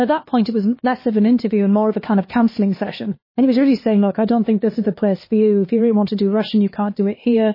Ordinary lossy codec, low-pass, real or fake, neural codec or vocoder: MP3, 24 kbps; 5.4 kHz; fake; codec, 16 kHz, 2 kbps, X-Codec, HuBERT features, trained on LibriSpeech